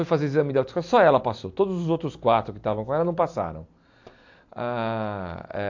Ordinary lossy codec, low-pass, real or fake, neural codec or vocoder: AAC, 48 kbps; 7.2 kHz; real; none